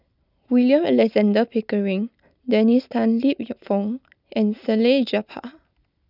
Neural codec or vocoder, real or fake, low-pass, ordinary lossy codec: none; real; 5.4 kHz; none